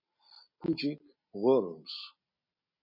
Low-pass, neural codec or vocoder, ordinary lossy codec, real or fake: 5.4 kHz; none; MP3, 24 kbps; real